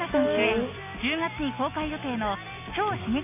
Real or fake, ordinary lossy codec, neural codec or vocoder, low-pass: real; none; none; 3.6 kHz